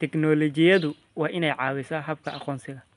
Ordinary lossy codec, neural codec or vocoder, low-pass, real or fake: none; none; 10.8 kHz; real